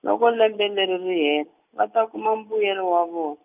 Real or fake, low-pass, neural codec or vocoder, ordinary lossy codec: real; 3.6 kHz; none; none